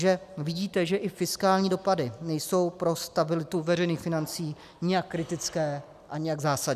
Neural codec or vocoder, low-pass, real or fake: none; 14.4 kHz; real